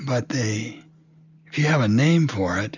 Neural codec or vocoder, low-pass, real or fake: none; 7.2 kHz; real